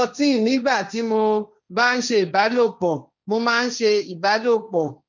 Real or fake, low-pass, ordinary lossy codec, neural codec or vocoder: fake; 7.2 kHz; none; codec, 16 kHz, 1.1 kbps, Voila-Tokenizer